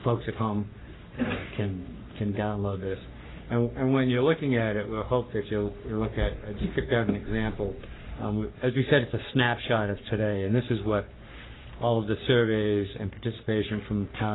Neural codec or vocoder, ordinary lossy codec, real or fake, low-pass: codec, 44.1 kHz, 3.4 kbps, Pupu-Codec; AAC, 16 kbps; fake; 7.2 kHz